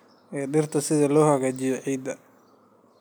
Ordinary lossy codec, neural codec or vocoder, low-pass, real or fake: none; none; none; real